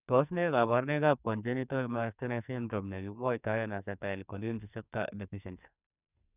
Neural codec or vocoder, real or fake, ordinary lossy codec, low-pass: codec, 44.1 kHz, 2.6 kbps, SNAC; fake; none; 3.6 kHz